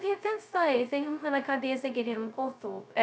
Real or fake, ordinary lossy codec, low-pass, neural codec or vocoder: fake; none; none; codec, 16 kHz, 0.2 kbps, FocalCodec